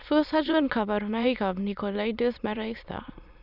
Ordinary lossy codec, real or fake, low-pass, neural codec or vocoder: none; fake; 5.4 kHz; autoencoder, 22.05 kHz, a latent of 192 numbers a frame, VITS, trained on many speakers